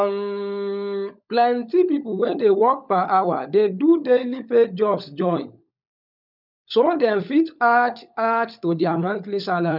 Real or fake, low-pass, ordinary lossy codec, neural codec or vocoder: fake; 5.4 kHz; none; codec, 16 kHz, 16 kbps, FunCodec, trained on LibriTTS, 50 frames a second